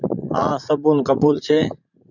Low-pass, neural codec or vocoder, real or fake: 7.2 kHz; vocoder, 44.1 kHz, 80 mel bands, Vocos; fake